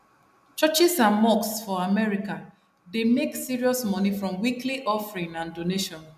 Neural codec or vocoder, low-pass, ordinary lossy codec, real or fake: none; 14.4 kHz; none; real